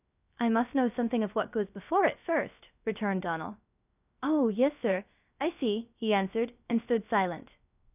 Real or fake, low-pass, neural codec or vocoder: fake; 3.6 kHz; codec, 16 kHz, 0.3 kbps, FocalCodec